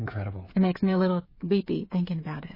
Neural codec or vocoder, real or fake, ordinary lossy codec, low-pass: codec, 16 kHz, 8 kbps, FreqCodec, smaller model; fake; MP3, 24 kbps; 5.4 kHz